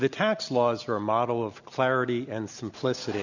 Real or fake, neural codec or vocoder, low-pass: real; none; 7.2 kHz